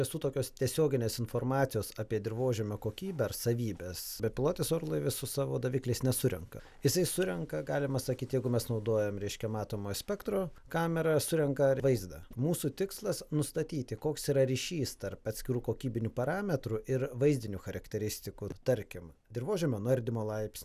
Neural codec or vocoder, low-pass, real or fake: none; 14.4 kHz; real